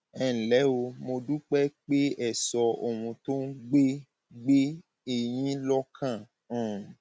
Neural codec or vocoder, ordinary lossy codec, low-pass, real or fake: none; none; none; real